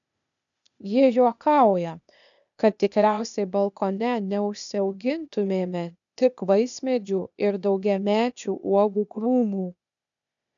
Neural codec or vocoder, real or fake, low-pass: codec, 16 kHz, 0.8 kbps, ZipCodec; fake; 7.2 kHz